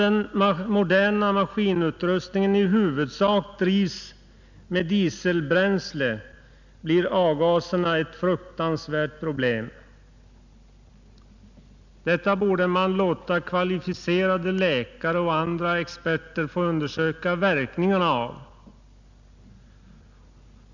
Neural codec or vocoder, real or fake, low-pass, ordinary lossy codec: none; real; 7.2 kHz; none